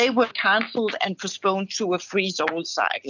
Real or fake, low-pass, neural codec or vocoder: real; 7.2 kHz; none